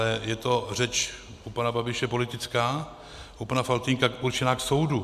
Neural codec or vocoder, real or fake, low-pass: none; real; 14.4 kHz